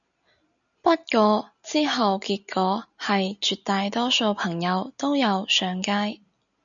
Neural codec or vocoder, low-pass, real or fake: none; 7.2 kHz; real